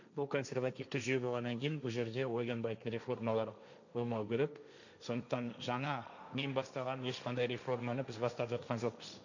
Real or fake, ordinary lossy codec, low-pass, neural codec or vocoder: fake; none; 7.2 kHz; codec, 16 kHz, 1.1 kbps, Voila-Tokenizer